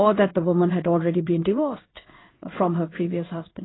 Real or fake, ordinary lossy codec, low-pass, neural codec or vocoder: fake; AAC, 16 kbps; 7.2 kHz; codec, 16 kHz in and 24 kHz out, 1 kbps, XY-Tokenizer